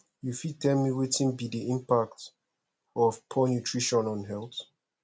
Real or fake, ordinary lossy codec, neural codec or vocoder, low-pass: real; none; none; none